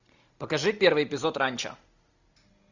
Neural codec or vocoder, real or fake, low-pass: none; real; 7.2 kHz